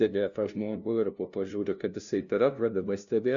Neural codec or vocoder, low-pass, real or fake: codec, 16 kHz, 0.5 kbps, FunCodec, trained on LibriTTS, 25 frames a second; 7.2 kHz; fake